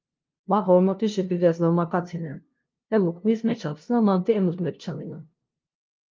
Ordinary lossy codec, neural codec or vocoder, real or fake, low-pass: Opus, 32 kbps; codec, 16 kHz, 0.5 kbps, FunCodec, trained on LibriTTS, 25 frames a second; fake; 7.2 kHz